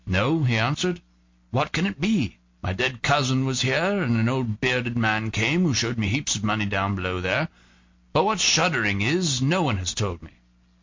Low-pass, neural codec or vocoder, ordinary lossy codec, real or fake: 7.2 kHz; none; MP3, 48 kbps; real